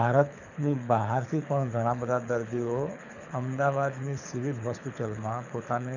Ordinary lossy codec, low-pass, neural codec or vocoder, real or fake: none; 7.2 kHz; codec, 24 kHz, 6 kbps, HILCodec; fake